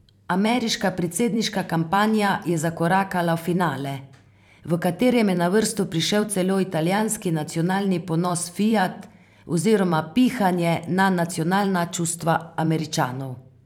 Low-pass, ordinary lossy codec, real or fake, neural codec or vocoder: 19.8 kHz; none; fake; vocoder, 44.1 kHz, 128 mel bands every 512 samples, BigVGAN v2